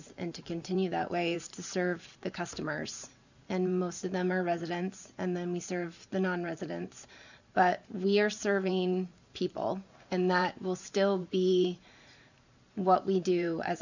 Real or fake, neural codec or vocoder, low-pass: fake; vocoder, 44.1 kHz, 128 mel bands every 512 samples, BigVGAN v2; 7.2 kHz